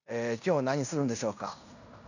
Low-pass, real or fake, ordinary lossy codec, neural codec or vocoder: 7.2 kHz; fake; none; codec, 16 kHz in and 24 kHz out, 0.9 kbps, LongCat-Audio-Codec, fine tuned four codebook decoder